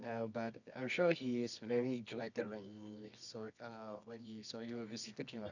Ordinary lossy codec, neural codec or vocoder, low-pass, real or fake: none; codec, 24 kHz, 0.9 kbps, WavTokenizer, medium music audio release; 7.2 kHz; fake